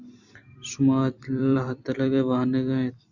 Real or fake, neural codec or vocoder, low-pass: fake; vocoder, 44.1 kHz, 128 mel bands every 256 samples, BigVGAN v2; 7.2 kHz